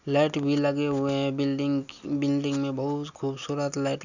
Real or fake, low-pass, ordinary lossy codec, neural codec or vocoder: real; 7.2 kHz; none; none